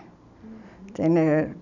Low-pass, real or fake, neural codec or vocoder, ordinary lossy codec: 7.2 kHz; real; none; none